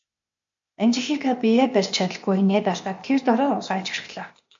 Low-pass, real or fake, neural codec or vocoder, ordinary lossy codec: 7.2 kHz; fake; codec, 16 kHz, 0.8 kbps, ZipCodec; MP3, 48 kbps